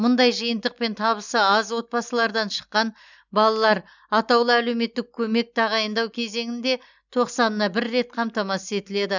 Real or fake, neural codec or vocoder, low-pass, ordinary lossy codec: real; none; 7.2 kHz; none